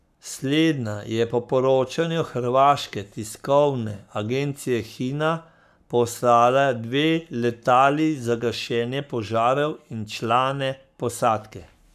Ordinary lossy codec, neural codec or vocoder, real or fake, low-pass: none; codec, 44.1 kHz, 7.8 kbps, Pupu-Codec; fake; 14.4 kHz